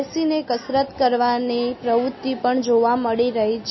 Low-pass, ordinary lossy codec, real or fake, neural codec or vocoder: 7.2 kHz; MP3, 24 kbps; real; none